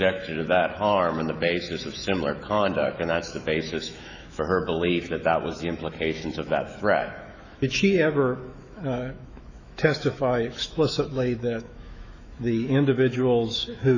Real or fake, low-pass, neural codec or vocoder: fake; 7.2 kHz; autoencoder, 48 kHz, 128 numbers a frame, DAC-VAE, trained on Japanese speech